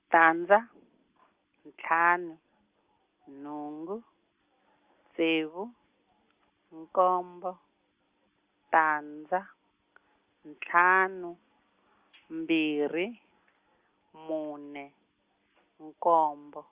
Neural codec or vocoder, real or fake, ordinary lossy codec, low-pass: none; real; Opus, 32 kbps; 3.6 kHz